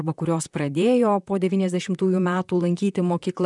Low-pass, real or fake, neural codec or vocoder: 10.8 kHz; fake; vocoder, 44.1 kHz, 128 mel bands, Pupu-Vocoder